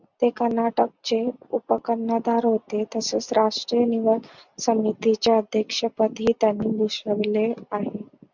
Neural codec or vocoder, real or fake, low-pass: none; real; 7.2 kHz